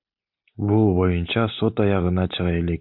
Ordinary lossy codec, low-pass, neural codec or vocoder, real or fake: MP3, 48 kbps; 5.4 kHz; none; real